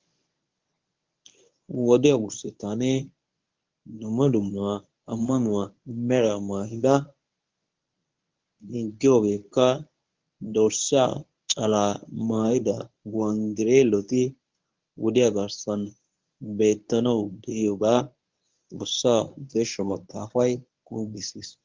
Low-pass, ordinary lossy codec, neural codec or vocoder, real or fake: 7.2 kHz; Opus, 32 kbps; codec, 24 kHz, 0.9 kbps, WavTokenizer, medium speech release version 1; fake